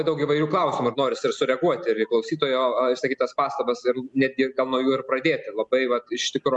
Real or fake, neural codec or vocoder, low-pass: real; none; 10.8 kHz